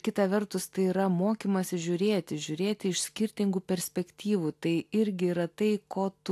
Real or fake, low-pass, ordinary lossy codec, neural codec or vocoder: real; 14.4 kHz; AAC, 64 kbps; none